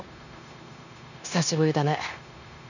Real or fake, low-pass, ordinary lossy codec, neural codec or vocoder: fake; 7.2 kHz; none; codec, 16 kHz, 0.9 kbps, LongCat-Audio-Codec